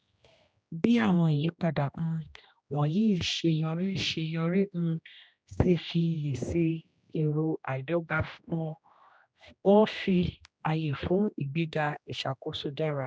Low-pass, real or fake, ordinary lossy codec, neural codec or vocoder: none; fake; none; codec, 16 kHz, 1 kbps, X-Codec, HuBERT features, trained on general audio